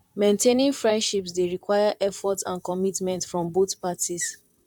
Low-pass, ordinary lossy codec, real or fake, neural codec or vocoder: 19.8 kHz; none; fake; vocoder, 44.1 kHz, 128 mel bands, Pupu-Vocoder